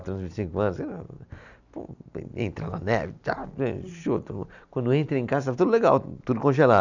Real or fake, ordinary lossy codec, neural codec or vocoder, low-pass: real; none; none; 7.2 kHz